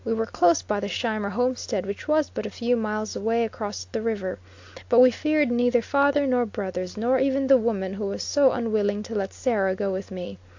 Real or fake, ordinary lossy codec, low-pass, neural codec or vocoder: real; AAC, 48 kbps; 7.2 kHz; none